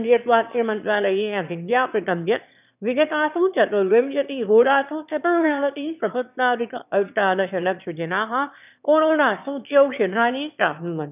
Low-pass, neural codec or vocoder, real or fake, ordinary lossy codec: 3.6 kHz; autoencoder, 22.05 kHz, a latent of 192 numbers a frame, VITS, trained on one speaker; fake; none